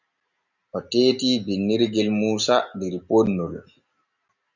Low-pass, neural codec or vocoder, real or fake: 7.2 kHz; none; real